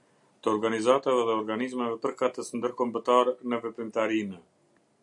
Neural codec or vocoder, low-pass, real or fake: none; 10.8 kHz; real